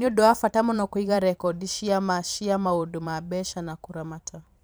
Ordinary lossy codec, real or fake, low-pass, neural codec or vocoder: none; fake; none; vocoder, 44.1 kHz, 128 mel bands every 512 samples, BigVGAN v2